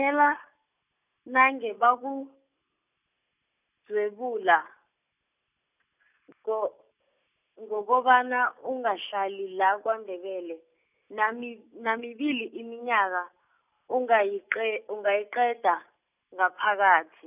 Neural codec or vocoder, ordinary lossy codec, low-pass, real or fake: none; none; 3.6 kHz; real